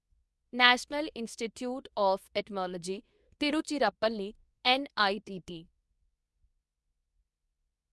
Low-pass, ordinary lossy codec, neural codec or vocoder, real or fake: none; none; codec, 24 kHz, 0.9 kbps, WavTokenizer, medium speech release version 2; fake